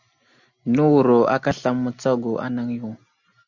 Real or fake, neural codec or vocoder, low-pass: real; none; 7.2 kHz